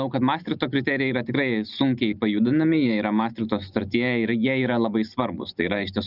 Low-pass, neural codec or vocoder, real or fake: 5.4 kHz; none; real